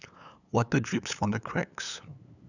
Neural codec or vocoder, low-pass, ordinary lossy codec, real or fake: codec, 16 kHz, 8 kbps, FunCodec, trained on LibriTTS, 25 frames a second; 7.2 kHz; none; fake